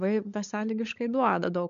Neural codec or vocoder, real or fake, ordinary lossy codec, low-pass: codec, 16 kHz, 16 kbps, FunCodec, trained on LibriTTS, 50 frames a second; fake; MP3, 64 kbps; 7.2 kHz